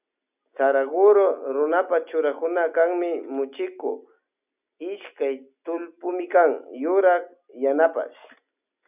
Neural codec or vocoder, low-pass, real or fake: none; 3.6 kHz; real